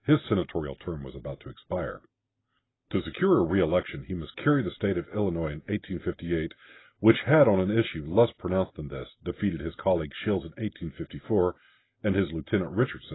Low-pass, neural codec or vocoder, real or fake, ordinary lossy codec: 7.2 kHz; none; real; AAC, 16 kbps